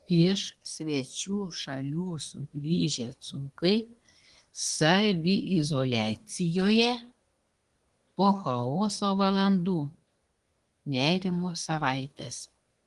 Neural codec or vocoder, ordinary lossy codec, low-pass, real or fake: codec, 24 kHz, 1 kbps, SNAC; Opus, 24 kbps; 10.8 kHz; fake